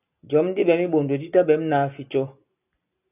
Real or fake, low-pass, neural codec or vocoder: real; 3.6 kHz; none